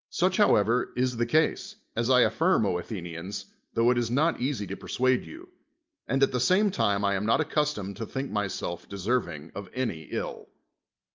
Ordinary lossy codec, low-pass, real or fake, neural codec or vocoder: Opus, 24 kbps; 7.2 kHz; real; none